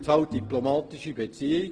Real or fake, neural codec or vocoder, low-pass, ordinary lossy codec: real; none; 9.9 kHz; Opus, 16 kbps